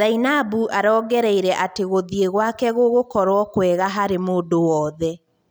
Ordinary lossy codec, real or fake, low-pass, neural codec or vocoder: none; real; none; none